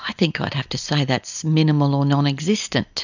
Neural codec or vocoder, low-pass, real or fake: none; 7.2 kHz; real